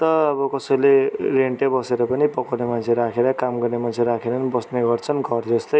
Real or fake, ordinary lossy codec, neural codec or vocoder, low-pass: real; none; none; none